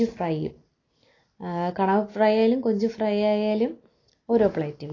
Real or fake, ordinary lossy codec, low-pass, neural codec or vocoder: real; AAC, 32 kbps; 7.2 kHz; none